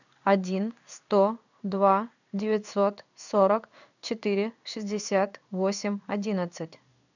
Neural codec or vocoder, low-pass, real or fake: codec, 16 kHz in and 24 kHz out, 1 kbps, XY-Tokenizer; 7.2 kHz; fake